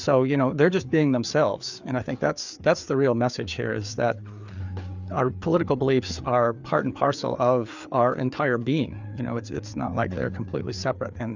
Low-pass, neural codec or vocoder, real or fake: 7.2 kHz; codec, 16 kHz, 4 kbps, FreqCodec, larger model; fake